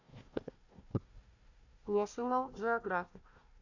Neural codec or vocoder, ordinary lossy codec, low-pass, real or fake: codec, 16 kHz, 1 kbps, FunCodec, trained on Chinese and English, 50 frames a second; none; 7.2 kHz; fake